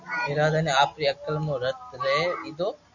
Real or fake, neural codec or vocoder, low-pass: real; none; 7.2 kHz